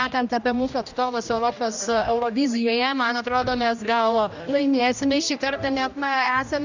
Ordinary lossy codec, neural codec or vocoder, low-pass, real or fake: Opus, 64 kbps; codec, 16 kHz, 1 kbps, X-Codec, HuBERT features, trained on general audio; 7.2 kHz; fake